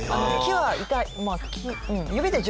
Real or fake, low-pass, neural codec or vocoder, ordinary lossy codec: real; none; none; none